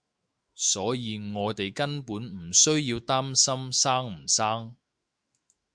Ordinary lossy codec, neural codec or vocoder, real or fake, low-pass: Opus, 64 kbps; autoencoder, 48 kHz, 128 numbers a frame, DAC-VAE, trained on Japanese speech; fake; 9.9 kHz